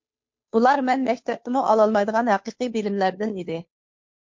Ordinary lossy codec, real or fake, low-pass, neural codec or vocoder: MP3, 48 kbps; fake; 7.2 kHz; codec, 16 kHz, 2 kbps, FunCodec, trained on Chinese and English, 25 frames a second